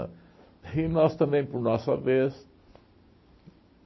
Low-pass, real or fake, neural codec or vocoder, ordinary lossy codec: 7.2 kHz; real; none; MP3, 24 kbps